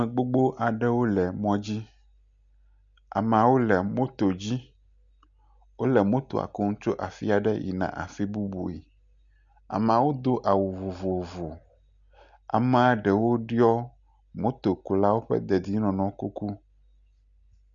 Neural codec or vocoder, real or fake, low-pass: none; real; 7.2 kHz